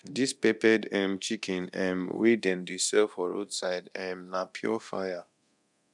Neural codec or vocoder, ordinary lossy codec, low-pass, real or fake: codec, 24 kHz, 0.9 kbps, DualCodec; none; 10.8 kHz; fake